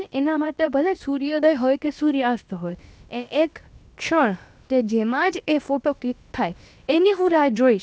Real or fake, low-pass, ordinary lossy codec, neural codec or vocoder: fake; none; none; codec, 16 kHz, about 1 kbps, DyCAST, with the encoder's durations